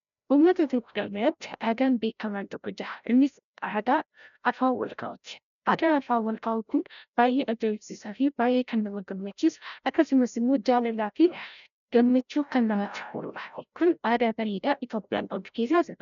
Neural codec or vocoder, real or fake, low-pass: codec, 16 kHz, 0.5 kbps, FreqCodec, larger model; fake; 7.2 kHz